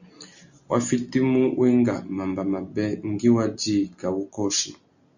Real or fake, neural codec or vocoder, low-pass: real; none; 7.2 kHz